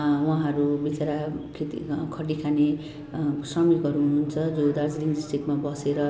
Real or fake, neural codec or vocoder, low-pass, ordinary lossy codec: real; none; none; none